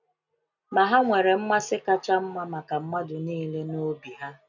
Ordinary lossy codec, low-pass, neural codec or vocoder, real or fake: none; 7.2 kHz; none; real